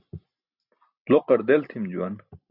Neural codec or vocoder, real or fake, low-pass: none; real; 5.4 kHz